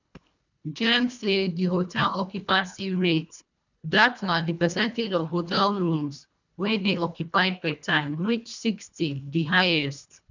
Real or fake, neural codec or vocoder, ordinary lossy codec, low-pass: fake; codec, 24 kHz, 1.5 kbps, HILCodec; none; 7.2 kHz